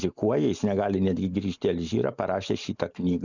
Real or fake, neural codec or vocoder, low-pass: real; none; 7.2 kHz